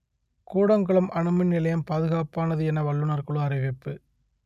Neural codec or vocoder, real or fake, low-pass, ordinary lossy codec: none; real; 14.4 kHz; none